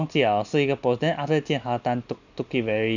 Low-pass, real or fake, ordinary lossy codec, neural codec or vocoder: 7.2 kHz; real; none; none